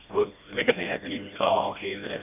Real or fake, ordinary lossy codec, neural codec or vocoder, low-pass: fake; none; codec, 16 kHz, 1 kbps, FreqCodec, smaller model; 3.6 kHz